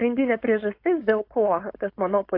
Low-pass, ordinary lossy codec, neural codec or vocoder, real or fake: 5.4 kHz; AAC, 32 kbps; codec, 16 kHz, 4.8 kbps, FACodec; fake